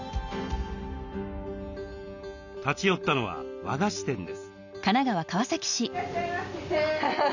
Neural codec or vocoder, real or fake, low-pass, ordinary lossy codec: none; real; 7.2 kHz; none